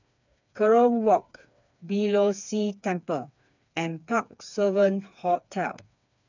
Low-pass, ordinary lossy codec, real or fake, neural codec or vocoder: 7.2 kHz; none; fake; codec, 16 kHz, 4 kbps, FreqCodec, smaller model